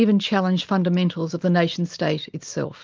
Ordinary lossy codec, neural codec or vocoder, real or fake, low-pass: Opus, 24 kbps; none; real; 7.2 kHz